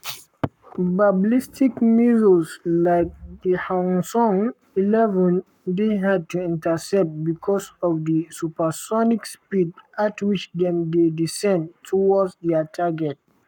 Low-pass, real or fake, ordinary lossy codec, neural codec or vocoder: 19.8 kHz; fake; none; codec, 44.1 kHz, 7.8 kbps, Pupu-Codec